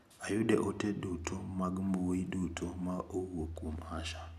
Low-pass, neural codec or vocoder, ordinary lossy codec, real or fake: 14.4 kHz; none; none; real